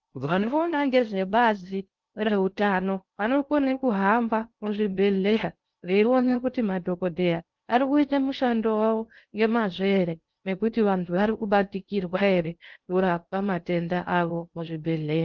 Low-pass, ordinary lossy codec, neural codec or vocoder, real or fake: 7.2 kHz; Opus, 24 kbps; codec, 16 kHz in and 24 kHz out, 0.6 kbps, FocalCodec, streaming, 2048 codes; fake